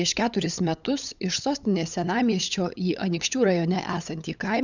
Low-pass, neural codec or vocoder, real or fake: 7.2 kHz; codec, 16 kHz, 8 kbps, FunCodec, trained on LibriTTS, 25 frames a second; fake